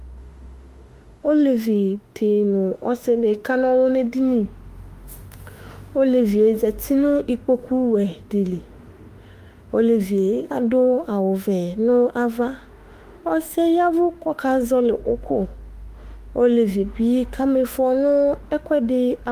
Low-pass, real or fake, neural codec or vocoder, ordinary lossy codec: 14.4 kHz; fake; autoencoder, 48 kHz, 32 numbers a frame, DAC-VAE, trained on Japanese speech; Opus, 32 kbps